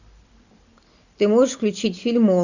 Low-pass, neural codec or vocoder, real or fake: 7.2 kHz; none; real